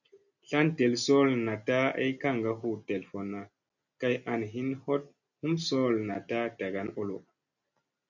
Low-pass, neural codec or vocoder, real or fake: 7.2 kHz; none; real